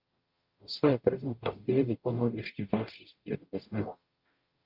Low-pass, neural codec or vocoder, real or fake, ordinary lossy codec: 5.4 kHz; codec, 44.1 kHz, 0.9 kbps, DAC; fake; Opus, 32 kbps